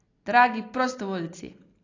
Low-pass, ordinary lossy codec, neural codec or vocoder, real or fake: 7.2 kHz; AAC, 32 kbps; none; real